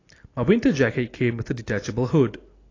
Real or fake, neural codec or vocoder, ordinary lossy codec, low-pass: real; none; AAC, 32 kbps; 7.2 kHz